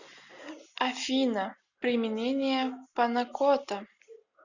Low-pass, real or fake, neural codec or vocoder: 7.2 kHz; real; none